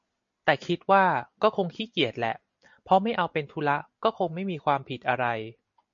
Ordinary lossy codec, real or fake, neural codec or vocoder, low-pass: MP3, 48 kbps; real; none; 7.2 kHz